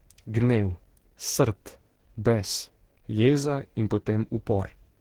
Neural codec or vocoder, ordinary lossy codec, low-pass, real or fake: codec, 44.1 kHz, 2.6 kbps, DAC; Opus, 16 kbps; 19.8 kHz; fake